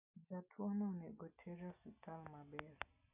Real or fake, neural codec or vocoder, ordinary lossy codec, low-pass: real; none; none; 3.6 kHz